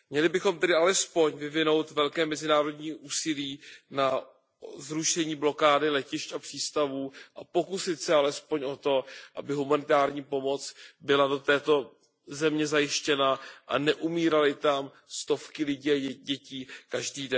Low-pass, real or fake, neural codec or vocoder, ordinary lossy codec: none; real; none; none